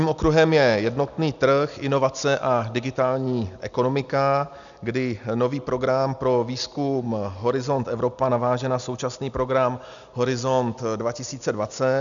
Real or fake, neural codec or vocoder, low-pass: real; none; 7.2 kHz